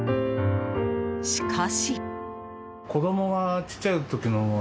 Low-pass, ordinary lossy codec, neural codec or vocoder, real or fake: none; none; none; real